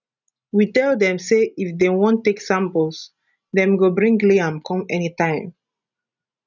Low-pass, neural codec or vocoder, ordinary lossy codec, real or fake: 7.2 kHz; none; none; real